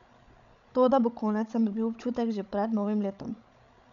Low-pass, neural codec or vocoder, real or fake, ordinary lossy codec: 7.2 kHz; codec, 16 kHz, 16 kbps, FreqCodec, larger model; fake; none